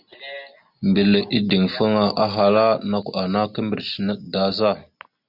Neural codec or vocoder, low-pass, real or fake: none; 5.4 kHz; real